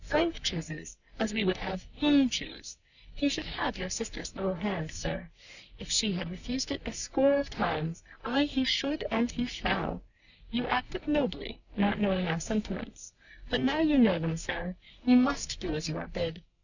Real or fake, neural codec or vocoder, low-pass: fake; codec, 44.1 kHz, 3.4 kbps, Pupu-Codec; 7.2 kHz